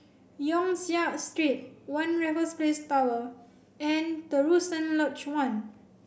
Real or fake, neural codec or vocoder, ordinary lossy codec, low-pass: real; none; none; none